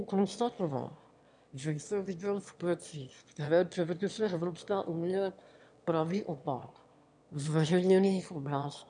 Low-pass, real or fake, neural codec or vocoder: 9.9 kHz; fake; autoencoder, 22.05 kHz, a latent of 192 numbers a frame, VITS, trained on one speaker